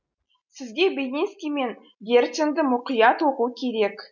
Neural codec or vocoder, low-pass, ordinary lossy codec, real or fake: none; 7.2 kHz; none; real